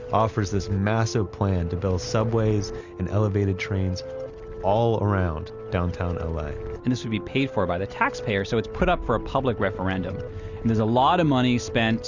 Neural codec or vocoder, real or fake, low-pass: none; real; 7.2 kHz